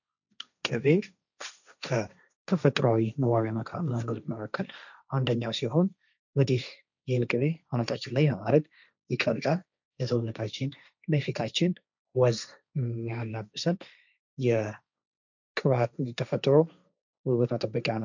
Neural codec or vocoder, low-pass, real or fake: codec, 16 kHz, 1.1 kbps, Voila-Tokenizer; 7.2 kHz; fake